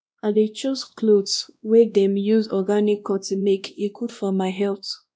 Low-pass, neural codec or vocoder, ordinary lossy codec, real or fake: none; codec, 16 kHz, 1 kbps, X-Codec, WavLM features, trained on Multilingual LibriSpeech; none; fake